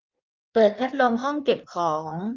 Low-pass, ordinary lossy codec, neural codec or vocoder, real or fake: 7.2 kHz; Opus, 24 kbps; codec, 16 kHz in and 24 kHz out, 1.1 kbps, FireRedTTS-2 codec; fake